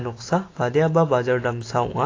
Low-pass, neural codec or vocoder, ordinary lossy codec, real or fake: 7.2 kHz; none; AAC, 32 kbps; real